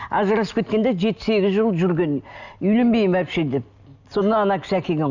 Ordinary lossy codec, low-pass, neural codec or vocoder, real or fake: none; 7.2 kHz; none; real